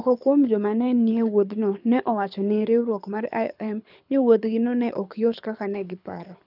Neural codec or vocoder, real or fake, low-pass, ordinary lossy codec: codec, 24 kHz, 6 kbps, HILCodec; fake; 5.4 kHz; none